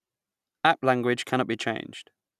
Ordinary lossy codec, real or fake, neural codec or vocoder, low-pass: none; real; none; 14.4 kHz